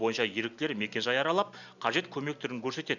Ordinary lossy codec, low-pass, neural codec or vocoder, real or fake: none; 7.2 kHz; none; real